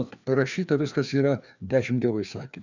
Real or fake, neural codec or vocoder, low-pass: fake; codec, 16 kHz, 2 kbps, FreqCodec, larger model; 7.2 kHz